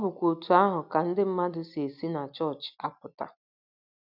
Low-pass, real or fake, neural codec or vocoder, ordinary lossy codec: 5.4 kHz; real; none; none